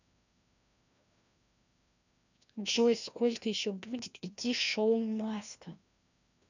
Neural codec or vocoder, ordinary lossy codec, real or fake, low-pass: codec, 16 kHz, 1 kbps, FreqCodec, larger model; none; fake; 7.2 kHz